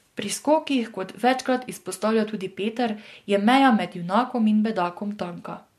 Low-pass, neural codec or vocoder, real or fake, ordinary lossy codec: 14.4 kHz; none; real; MP3, 64 kbps